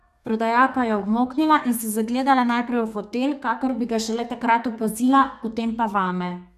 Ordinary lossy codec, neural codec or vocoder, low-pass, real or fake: none; codec, 32 kHz, 1.9 kbps, SNAC; 14.4 kHz; fake